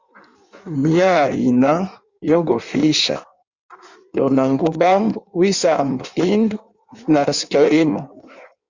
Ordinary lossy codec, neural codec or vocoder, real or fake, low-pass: Opus, 64 kbps; codec, 16 kHz in and 24 kHz out, 1.1 kbps, FireRedTTS-2 codec; fake; 7.2 kHz